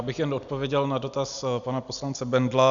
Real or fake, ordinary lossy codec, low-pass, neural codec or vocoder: real; MP3, 96 kbps; 7.2 kHz; none